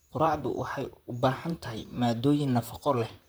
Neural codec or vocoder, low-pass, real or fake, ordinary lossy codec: vocoder, 44.1 kHz, 128 mel bands, Pupu-Vocoder; none; fake; none